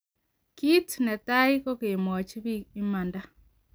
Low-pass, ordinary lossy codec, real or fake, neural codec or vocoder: none; none; real; none